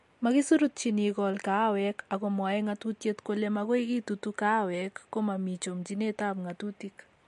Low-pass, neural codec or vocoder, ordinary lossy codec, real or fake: 14.4 kHz; none; MP3, 48 kbps; real